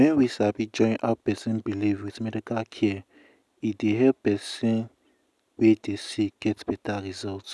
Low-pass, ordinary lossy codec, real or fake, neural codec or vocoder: none; none; real; none